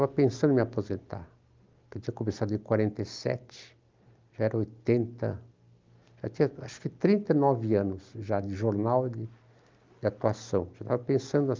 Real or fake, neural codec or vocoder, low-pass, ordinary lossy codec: real; none; 7.2 kHz; Opus, 24 kbps